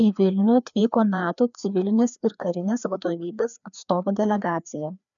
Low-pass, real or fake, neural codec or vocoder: 7.2 kHz; fake; codec, 16 kHz, 2 kbps, FreqCodec, larger model